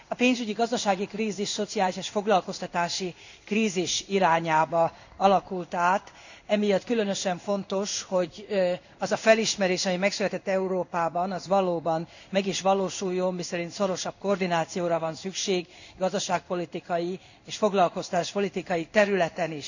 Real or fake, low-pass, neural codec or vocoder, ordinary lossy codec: fake; 7.2 kHz; codec, 16 kHz in and 24 kHz out, 1 kbps, XY-Tokenizer; none